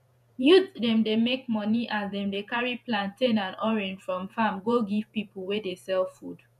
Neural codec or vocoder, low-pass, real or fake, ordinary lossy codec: vocoder, 48 kHz, 128 mel bands, Vocos; 14.4 kHz; fake; none